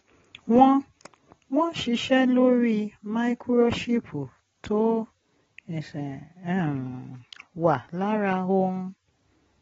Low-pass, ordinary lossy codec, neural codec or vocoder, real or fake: 7.2 kHz; AAC, 24 kbps; none; real